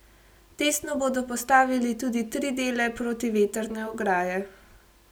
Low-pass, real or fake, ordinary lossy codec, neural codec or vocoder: none; real; none; none